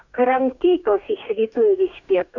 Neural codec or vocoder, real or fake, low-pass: codec, 44.1 kHz, 2.6 kbps, DAC; fake; 7.2 kHz